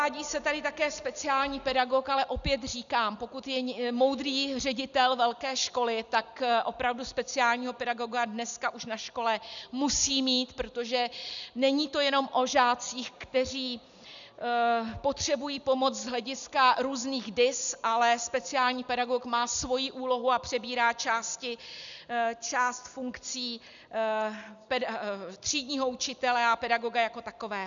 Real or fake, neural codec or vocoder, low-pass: real; none; 7.2 kHz